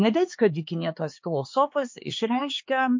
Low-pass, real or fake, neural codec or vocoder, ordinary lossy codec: 7.2 kHz; fake; codec, 16 kHz, 4 kbps, X-Codec, HuBERT features, trained on LibriSpeech; MP3, 48 kbps